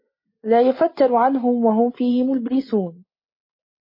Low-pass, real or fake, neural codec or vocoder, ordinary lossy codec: 5.4 kHz; real; none; MP3, 24 kbps